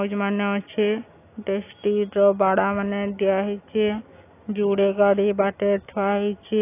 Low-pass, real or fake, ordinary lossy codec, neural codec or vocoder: 3.6 kHz; real; AAC, 24 kbps; none